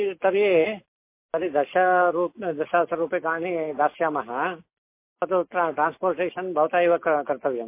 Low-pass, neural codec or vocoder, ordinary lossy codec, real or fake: 3.6 kHz; none; MP3, 24 kbps; real